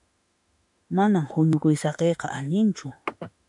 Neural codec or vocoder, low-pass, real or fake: autoencoder, 48 kHz, 32 numbers a frame, DAC-VAE, trained on Japanese speech; 10.8 kHz; fake